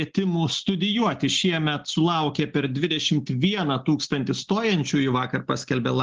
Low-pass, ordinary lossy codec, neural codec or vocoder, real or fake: 7.2 kHz; Opus, 16 kbps; none; real